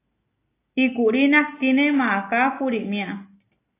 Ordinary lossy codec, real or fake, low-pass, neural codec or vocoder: AAC, 24 kbps; real; 3.6 kHz; none